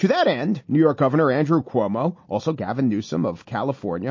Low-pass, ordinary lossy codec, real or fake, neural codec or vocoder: 7.2 kHz; MP3, 32 kbps; real; none